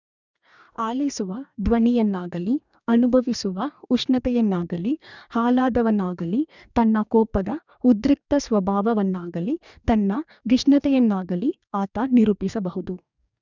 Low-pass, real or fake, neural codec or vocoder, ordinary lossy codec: 7.2 kHz; fake; codec, 44.1 kHz, 2.6 kbps, DAC; none